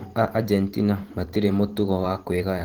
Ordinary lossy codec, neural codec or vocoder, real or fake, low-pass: Opus, 16 kbps; vocoder, 44.1 kHz, 128 mel bands every 512 samples, BigVGAN v2; fake; 19.8 kHz